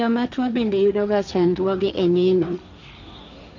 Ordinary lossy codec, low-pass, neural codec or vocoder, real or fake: none; 7.2 kHz; codec, 16 kHz, 1.1 kbps, Voila-Tokenizer; fake